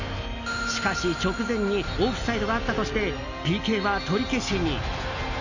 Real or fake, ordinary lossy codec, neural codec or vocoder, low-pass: real; none; none; 7.2 kHz